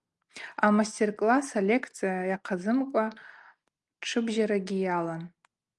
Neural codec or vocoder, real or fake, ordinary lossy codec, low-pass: none; real; Opus, 32 kbps; 10.8 kHz